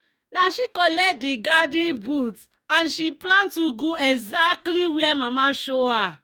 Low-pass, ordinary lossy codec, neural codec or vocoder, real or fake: 19.8 kHz; none; codec, 44.1 kHz, 2.6 kbps, DAC; fake